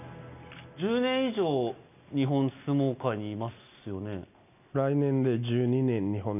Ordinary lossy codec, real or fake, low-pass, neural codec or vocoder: none; real; 3.6 kHz; none